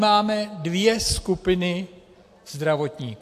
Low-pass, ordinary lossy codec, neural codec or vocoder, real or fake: 14.4 kHz; AAC, 64 kbps; none; real